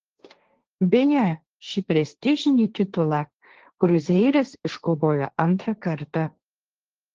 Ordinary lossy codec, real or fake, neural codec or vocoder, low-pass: Opus, 16 kbps; fake; codec, 16 kHz, 1.1 kbps, Voila-Tokenizer; 7.2 kHz